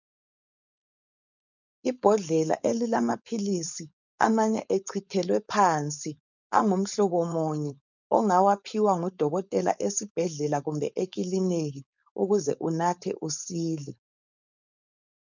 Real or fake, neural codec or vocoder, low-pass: fake; codec, 16 kHz, 4.8 kbps, FACodec; 7.2 kHz